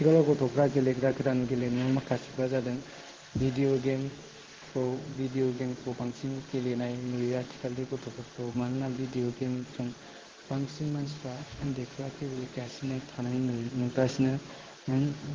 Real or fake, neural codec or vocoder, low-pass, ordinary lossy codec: fake; codec, 16 kHz in and 24 kHz out, 1 kbps, XY-Tokenizer; 7.2 kHz; Opus, 16 kbps